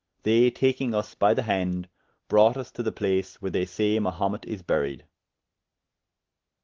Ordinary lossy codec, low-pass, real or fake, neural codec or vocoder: Opus, 16 kbps; 7.2 kHz; real; none